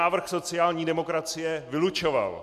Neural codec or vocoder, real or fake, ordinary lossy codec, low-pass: none; real; MP3, 64 kbps; 14.4 kHz